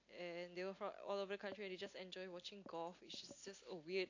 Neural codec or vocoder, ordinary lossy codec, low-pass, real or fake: none; AAC, 48 kbps; 7.2 kHz; real